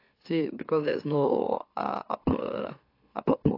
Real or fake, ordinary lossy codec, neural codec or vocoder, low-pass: fake; AAC, 32 kbps; autoencoder, 44.1 kHz, a latent of 192 numbers a frame, MeloTTS; 5.4 kHz